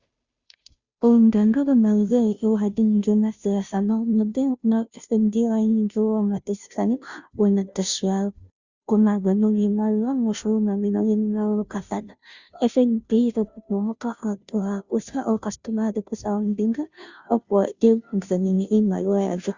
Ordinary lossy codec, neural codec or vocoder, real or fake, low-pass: Opus, 64 kbps; codec, 16 kHz, 0.5 kbps, FunCodec, trained on Chinese and English, 25 frames a second; fake; 7.2 kHz